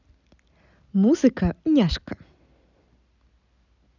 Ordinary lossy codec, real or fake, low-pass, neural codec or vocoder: none; real; 7.2 kHz; none